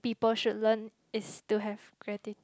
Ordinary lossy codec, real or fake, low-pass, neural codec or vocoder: none; real; none; none